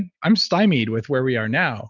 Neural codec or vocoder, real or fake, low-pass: none; real; 7.2 kHz